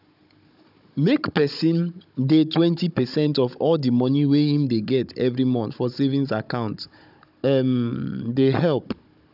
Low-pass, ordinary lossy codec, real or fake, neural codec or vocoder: 5.4 kHz; none; fake; codec, 16 kHz, 16 kbps, FunCodec, trained on Chinese and English, 50 frames a second